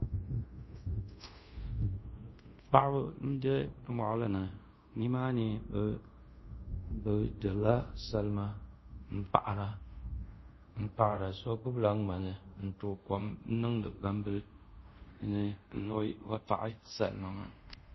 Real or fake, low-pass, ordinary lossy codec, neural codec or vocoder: fake; 7.2 kHz; MP3, 24 kbps; codec, 24 kHz, 0.5 kbps, DualCodec